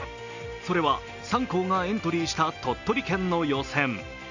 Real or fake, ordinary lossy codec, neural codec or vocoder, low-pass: real; none; none; 7.2 kHz